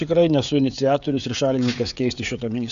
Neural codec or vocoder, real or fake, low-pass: codec, 16 kHz, 16 kbps, FreqCodec, smaller model; fake; 7.2 kHz